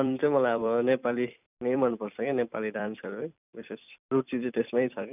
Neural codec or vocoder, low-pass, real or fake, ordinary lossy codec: vocoder, 44.1 kHz, 128 mel bands every 256 samples, BigVGAN v2; 3.6 kHz; fake; none